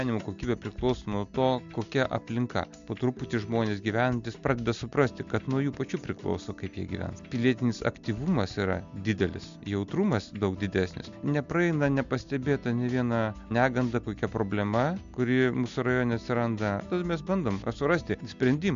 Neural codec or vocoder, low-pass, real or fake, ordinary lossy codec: none; 7.2 kHz; real; MP3, 64 kbps